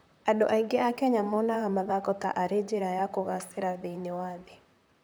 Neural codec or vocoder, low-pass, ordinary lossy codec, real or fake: vocoder, 44.1 kHz, 128 mel bands, Pupu-Vocoder; none; none; fake